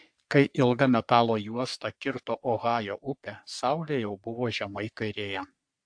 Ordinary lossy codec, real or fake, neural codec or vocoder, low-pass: AAC, 64 kbps; fake; codec, 44.1 kHz, 3.4 kbps, Pupu-Codec; 9.9 kHz